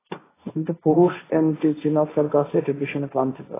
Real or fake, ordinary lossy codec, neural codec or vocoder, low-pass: fake; AAC, 16 kbps; codec, 16 kHz, 1.1 kbps, Voila-Tokenizer; 3.6 kHz